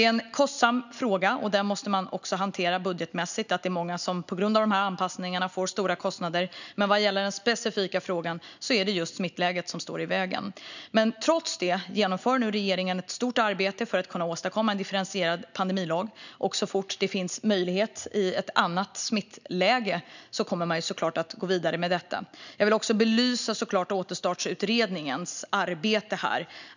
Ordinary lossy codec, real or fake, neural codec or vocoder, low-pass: none; real; none; 7.2 kHz